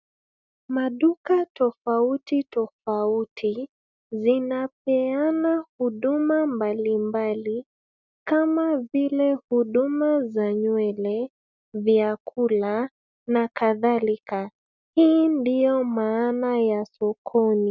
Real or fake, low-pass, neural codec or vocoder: real; 7.2 kHz; none